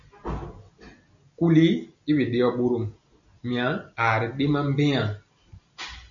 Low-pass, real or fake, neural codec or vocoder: 7.2 kHz; real; none